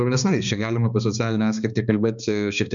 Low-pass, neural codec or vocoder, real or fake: 7.2 kHz; codec, 16 kHz, 2 kbps, X-Codec, HuBERT features, trained on balanced general audio; fake